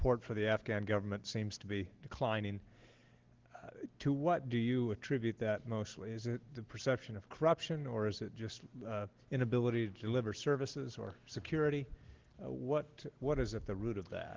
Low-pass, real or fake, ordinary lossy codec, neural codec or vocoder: 7.2 kHz; real; Opus, 16 kbps; none